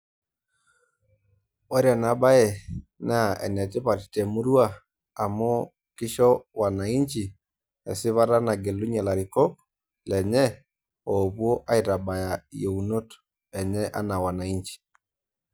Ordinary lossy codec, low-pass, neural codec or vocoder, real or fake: none; none; none; real